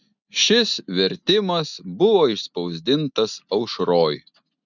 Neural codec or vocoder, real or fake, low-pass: none; real; 7.2 kHz